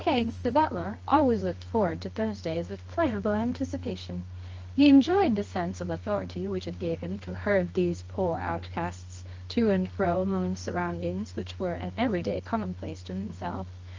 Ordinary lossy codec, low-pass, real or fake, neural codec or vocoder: Opus, 24 kbps; 7.2 kHz; fake; codec, 24 kHz, 0.9 kbps, WavTokenizer, medium music audio release